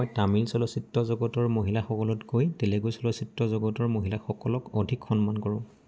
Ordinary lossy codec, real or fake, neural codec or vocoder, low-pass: none; real; none; none